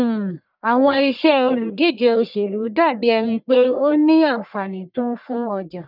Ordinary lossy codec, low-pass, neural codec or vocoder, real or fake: none; 5.4 kHz; codec, 44.1 kHz, 1.7 kbps, Pupu-Codec; fake